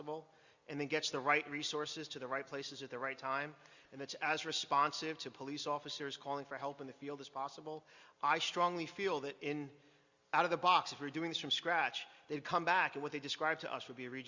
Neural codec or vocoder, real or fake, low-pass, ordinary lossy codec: none; real; 7.2 kHz; Opus, 64 kbps